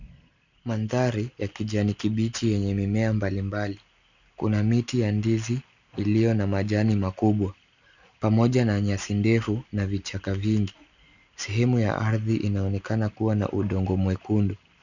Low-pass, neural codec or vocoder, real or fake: 7.2 kHz; none; real